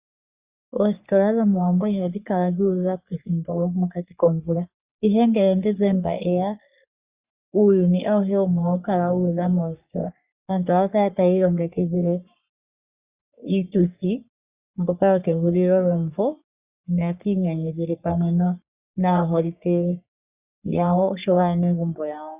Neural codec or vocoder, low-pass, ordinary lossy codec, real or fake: codec, 44.1 kHz, 3.4 kbps, Pupu-Codec; 3.6 kHz; Opus, 64 kbps; fake